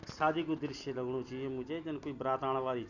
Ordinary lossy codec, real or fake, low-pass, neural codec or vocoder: none; real; 7.2 kHz; none